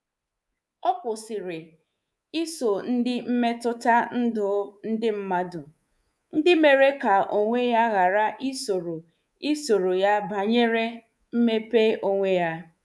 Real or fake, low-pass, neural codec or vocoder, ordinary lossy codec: fake; none; codec, 24 kHz, 3.1 kbps, DualCodec; none